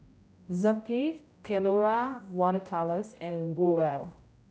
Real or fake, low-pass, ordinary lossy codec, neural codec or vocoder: fake; none; none; codec, 16 kHz, 0.5 kbps, X-Codec, HuBERT features, trained on general audio